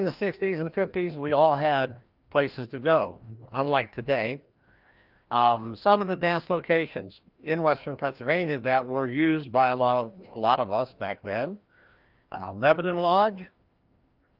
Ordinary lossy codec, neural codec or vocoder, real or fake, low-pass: Opus, 24 kbps; codec, 16 kHz, 1 kbps, FreqCodec, larger model; fake; 5.4 kHz